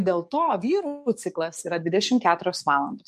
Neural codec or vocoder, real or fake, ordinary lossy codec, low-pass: codec, 44.1 kHz, 7.8 kbps, DAC; fake; MP3, 64 kbps; 14.4 kHz